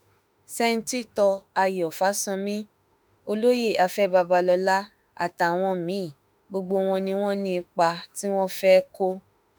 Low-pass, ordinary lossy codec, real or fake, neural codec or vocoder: none; none; fake; autoencoder, 48 kHz, 32 numbers a frame, DAC-VAE, trained on Japanese speech